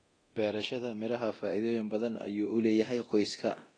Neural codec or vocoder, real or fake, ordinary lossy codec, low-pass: codec, 24 kHz, 0.9 kbps, DualCodec; fake; AAC, 32 kbps; 9.9 kHz